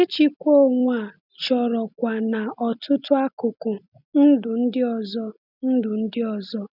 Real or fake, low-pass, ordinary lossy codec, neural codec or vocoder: real; 5.4 kHz; none; none